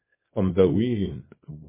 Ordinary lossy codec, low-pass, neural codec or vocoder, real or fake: MP3, 16 kbps; 3.6 kHz; codec, 24 kHz, 0.9 kbps, WavTokenizer, small release; fake